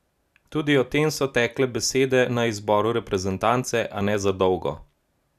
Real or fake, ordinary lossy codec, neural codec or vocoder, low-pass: real; none; none; 14.4 kHz